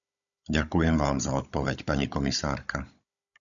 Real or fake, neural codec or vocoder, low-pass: fake; codec, 16 kHz, 16 kbps, FunCodec, trained on Chinese and English, 50 frames a second; 7.2 kHz